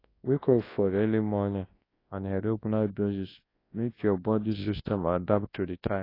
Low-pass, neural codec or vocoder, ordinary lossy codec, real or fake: 5.4 kHz; codec, 24 kHz, 0.9 kbps, WavTokenizer, large speech release; AAC, 24 kbps; fake